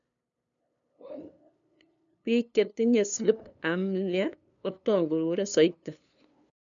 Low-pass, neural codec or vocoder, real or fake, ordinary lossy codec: 7.2 kHz; codec, 16 kHz, 2 kbps, FunCodec, trained on LibriTTS, 25 frames a second; fake; none